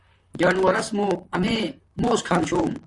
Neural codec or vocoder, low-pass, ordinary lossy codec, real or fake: vocoder, 44.1 kHz, 128 mel bands, Pupu-Vocoder; 10.8 kHz; AAC, 64 kbps; fake